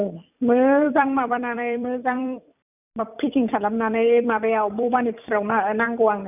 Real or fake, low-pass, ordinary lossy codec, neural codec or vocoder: fake; 3.6 kHz; none; vocoder, 44.1 kHz, 128 mel bands every 256 samples, BigVGAN v2